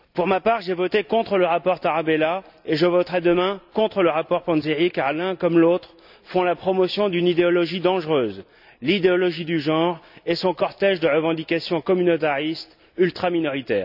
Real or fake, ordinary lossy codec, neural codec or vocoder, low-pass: real; none; none; 5.4 kHz